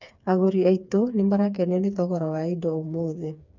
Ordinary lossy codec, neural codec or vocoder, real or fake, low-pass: none; codec, 16 kHz, 4 kbps, FreqCodec, smaller model; fake; 7.2 kHz